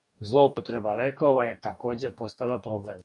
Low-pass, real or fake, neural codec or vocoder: 10.8 kHz; fake; codec, 44.1 kHz, 2.6 kbps, DAC